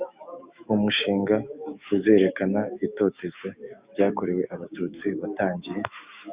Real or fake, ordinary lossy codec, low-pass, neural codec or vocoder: fake; Opus, 64 kbps; 3.6 kHz; autoencoder, 48 kHz, 128 numbers a frame, DAC-VAE, trained on Japanese speech